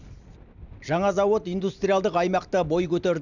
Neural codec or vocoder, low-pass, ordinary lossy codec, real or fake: none; 7.2 kHz; none; real